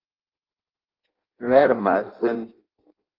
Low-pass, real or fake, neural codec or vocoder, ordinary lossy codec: 5.4 kHz; fake; codec, 16 kHz in and 24 kHz out, 0.6 kbps, FireRedTTS-2 codec; Opus, 24 kbps